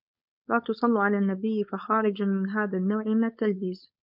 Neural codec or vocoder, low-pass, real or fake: codec, 16 kHz, 4.8 kbps, FACodec; 5.4 kHz; fake